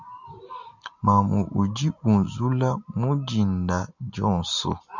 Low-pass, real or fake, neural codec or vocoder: 7.2 kHz; real; none